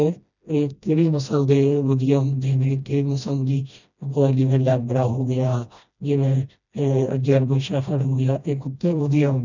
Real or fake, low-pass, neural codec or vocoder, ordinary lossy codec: fake; 7.2 kHz; codec, 16 kHz, 1 kbps, FreqCodec, smaller model; none